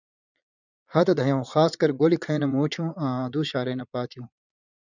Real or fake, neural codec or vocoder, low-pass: fake; vocoder, 22.05 kHz, 80 mel bands, Vocos; 7.2 kHz